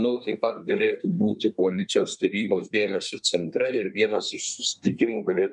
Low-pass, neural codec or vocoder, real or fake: 10.8 kHz; codec, 24 kHz, 1 kbps, SNAC; fake